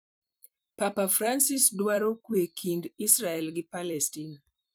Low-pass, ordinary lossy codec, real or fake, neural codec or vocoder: none; none; fake; vocoder, 44.1 kHz, 128 mel bands every 256 samples, BigVGAN v2